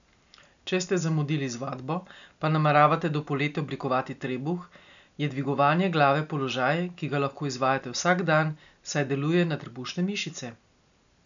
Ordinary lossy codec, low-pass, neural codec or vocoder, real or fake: MP3, 96 kbps; 7.2 kHz; none; real